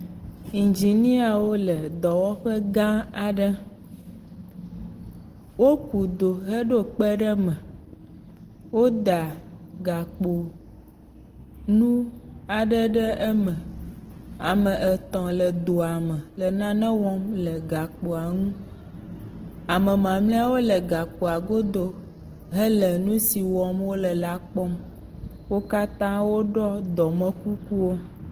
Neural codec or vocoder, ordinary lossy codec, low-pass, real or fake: none; Opus, 16 kbps; 14.4 kHz; real